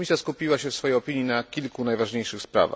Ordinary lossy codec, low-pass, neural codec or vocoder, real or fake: none; none; none; real